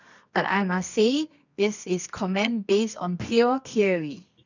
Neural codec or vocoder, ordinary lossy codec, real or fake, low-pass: codec, 24 kHz, 0.9 kbps, WavTokenizer, medium music audio release; none; fake; 7.2 kHz